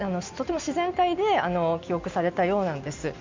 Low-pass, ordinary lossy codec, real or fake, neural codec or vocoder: 7.2 kHz; MP3, 64 kbps; real; none